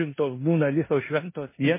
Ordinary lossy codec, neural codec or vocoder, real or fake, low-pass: MP3, 16 kbps; codec, 16 kHz in and 24 kHz out, 0.9 kbps, LongCat-Audio-Codec, four codebook decoder; fake; 3.6 kHz